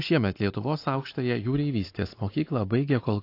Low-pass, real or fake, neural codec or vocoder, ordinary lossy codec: 5.4 kHz; real; none; AAC, 32 kbps